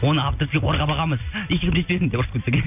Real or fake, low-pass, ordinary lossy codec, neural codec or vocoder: real; 3.6 kHz; none; none